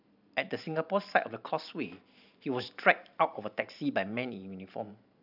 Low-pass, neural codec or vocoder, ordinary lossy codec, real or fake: 5.4 kHz; vocoder, 44.1 kHz, 128 mel bands every 512 samples, BigVGAN v2; none; fake